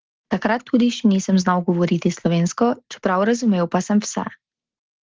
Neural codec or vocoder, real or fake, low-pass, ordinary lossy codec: none; real; 7.2 kHz; Opus, 16 kbps